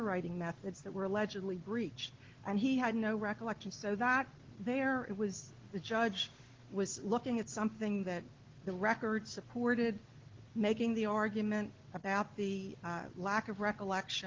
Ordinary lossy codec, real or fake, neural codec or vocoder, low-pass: Opus, 16 kbps; real; none; 7.2 kHz